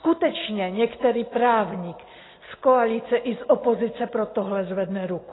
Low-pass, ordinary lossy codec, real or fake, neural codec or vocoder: 7.2 kHz; AAC, 16 kbps; real; none